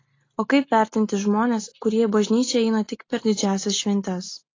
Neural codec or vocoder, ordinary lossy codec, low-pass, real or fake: none; AAC, 32 kbps; 7.2 kHz; real